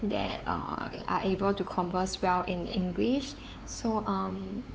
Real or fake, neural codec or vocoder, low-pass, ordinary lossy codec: fake; codec, 16 kHz, 4 kbps, X-Codec, WavLM features, trained on Multilingual LibriSpeech; none; none